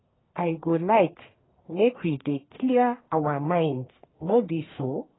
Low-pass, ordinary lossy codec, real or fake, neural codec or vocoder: 7.2 kHz; AAC, 16 kbps; fake; codec, 44.1 kHz, 1.7 kbps, Pupu-Codec